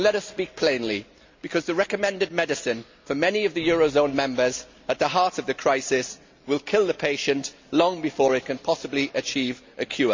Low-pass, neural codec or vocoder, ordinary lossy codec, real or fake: 7.2 kHz; none; MP3, 64 kbps; real